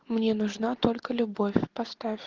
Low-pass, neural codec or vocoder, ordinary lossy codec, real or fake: 7.2 kHz; none; Opus, 16 kbps; real